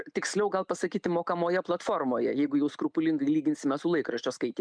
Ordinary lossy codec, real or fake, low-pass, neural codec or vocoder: MP3, 96 kbps; real; 9.9 kHz; none